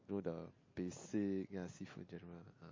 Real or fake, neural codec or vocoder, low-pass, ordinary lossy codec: real; none; 7.2 kHz; MP3, 32 kbps